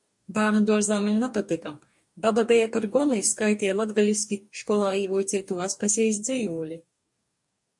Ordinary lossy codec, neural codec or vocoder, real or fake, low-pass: MP3, 64 kbps; codec, 44.1 kHz, 2.6 kbps, DAC; fake; 10.8 kHz